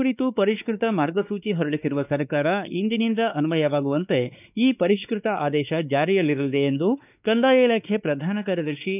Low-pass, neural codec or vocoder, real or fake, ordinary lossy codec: 3.6 kHz; codec, 16 kHz, 2 kbps, X-Codec, WavLM features, trained on Multilingual LibriSpeech; fake; none